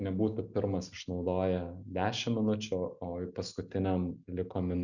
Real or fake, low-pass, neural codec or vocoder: real; 7.2 kHz; none